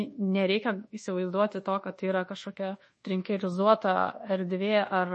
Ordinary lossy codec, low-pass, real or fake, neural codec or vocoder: MP3, 32 kbps; 10.8 kHz; fake; codec, 24 kHz, 1.2 kbps, DualCodec